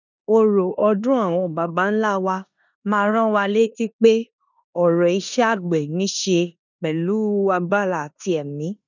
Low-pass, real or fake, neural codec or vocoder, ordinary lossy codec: 7.2 kHz; fake; codec, 16 kHz in and 24 kHz out, 0.9 kbps, LongCat-Audio-Codec, four codebook decoder; none